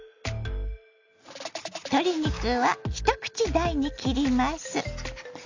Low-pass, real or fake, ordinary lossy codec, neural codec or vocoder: 7.2 kHz; real; AAC, 48 kbps; none